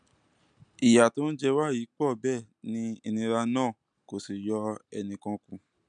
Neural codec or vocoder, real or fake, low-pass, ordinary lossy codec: none; real; 9.9 kHz; MP3, 96 kbps